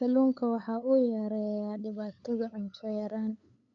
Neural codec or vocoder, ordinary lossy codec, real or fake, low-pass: codec, 16 kHz, 8 kbps, FunCodec, trained on LibriTTS, 25 frames a second; MP3, 48 kbps; fake; 7.2 kHz